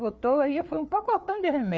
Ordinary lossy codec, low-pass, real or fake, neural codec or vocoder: none; none; fake; codec, 16 kHz, 4 kbps, FreqCodec, larger model